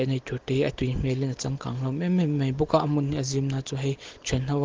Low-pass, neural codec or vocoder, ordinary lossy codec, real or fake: 7.2 kHz; none; Opus, 16 kbps; real